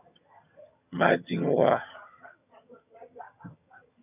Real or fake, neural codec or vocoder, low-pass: fake; vocoder, 22.05 kHz, 80 mel bands, HiFi-GAN; 3.6 kHz